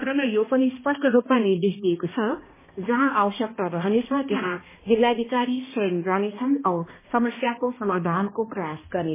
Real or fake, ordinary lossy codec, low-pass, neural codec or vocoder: fake; MP3, 16 kbps; 3.6 kHz; codec, 16 kHz, 1 kbps, X-Codec, HuBERT features, trained on balanced general audio